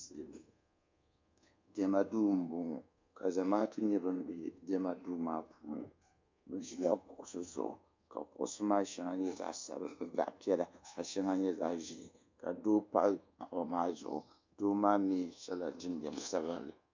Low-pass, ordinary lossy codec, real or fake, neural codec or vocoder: 7.2 kHz; MP3, 64 kbps; fake; codec, 24 kHz, 1.2 kbps, DualCodec